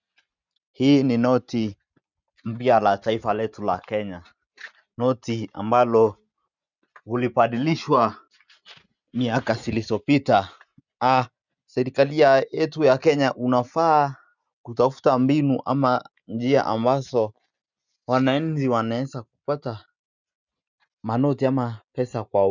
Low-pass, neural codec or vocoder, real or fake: 7.2 kHz; none; real